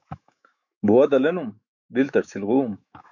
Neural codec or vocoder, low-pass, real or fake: autoencoder, 48 kHz, 128 numbers a frame, DAC-VAE, trained on Japanese speech; 7.2 kHz; fake